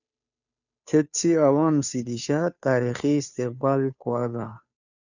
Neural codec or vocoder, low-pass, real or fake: codec, 16 kHz, 2 kbps, FunCodec, trained on Chinese and English, 25 frames a second; 7.2 kHz; fake